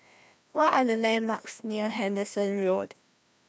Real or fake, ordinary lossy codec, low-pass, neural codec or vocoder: fake; none; none; codec, 16 kHz, 1 kbps, FreqCodec, larger model